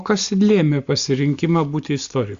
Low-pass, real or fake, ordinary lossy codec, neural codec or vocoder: 7.2 kHz; real; Opus, 64 kbps; none